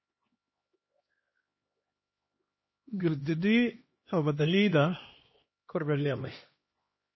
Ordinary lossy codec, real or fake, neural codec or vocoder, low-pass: MP3, 24 kbps; fake; codec, 16 kHz, 1 kbps, X-Codec, HuBERT features, trained on LibriSpeech; 7.2 kHz